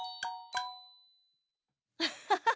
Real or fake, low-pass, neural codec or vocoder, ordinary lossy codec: real; none; none; none